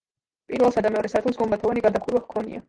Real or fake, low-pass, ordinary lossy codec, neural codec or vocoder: real; 9.9 kHz; Opus, 16 kbps; none